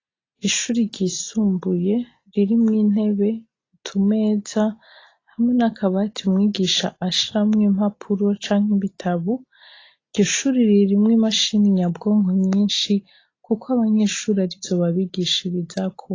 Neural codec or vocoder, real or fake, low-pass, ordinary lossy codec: none; real; 7.2 kHz; AAC, 32 kbps